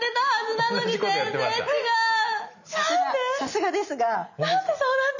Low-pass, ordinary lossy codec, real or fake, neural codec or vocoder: 7.2 kHz; none; real; none